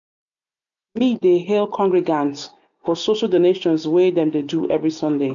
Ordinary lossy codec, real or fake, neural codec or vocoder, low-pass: MP3, 96 kbps; real; none; 7.2 kHz